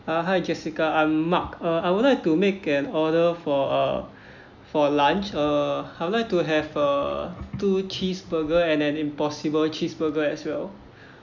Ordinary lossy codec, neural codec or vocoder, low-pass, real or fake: none; none; 7.2 kHz; real